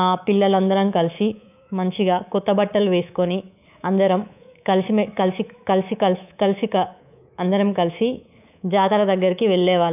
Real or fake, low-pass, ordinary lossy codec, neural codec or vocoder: fake; 3.6 kHz; none; codec, 24 kHz, 3.1 kbps, DualCodec